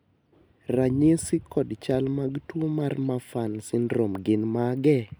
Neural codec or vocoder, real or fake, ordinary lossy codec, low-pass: none; real; none; none